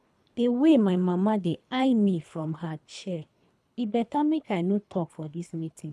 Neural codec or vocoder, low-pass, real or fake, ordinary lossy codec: codec, 24 kHz, 3 kbps, HILCodec; none; fake; none